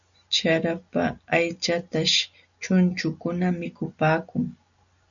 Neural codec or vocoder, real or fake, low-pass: none; real; 7.2 kHz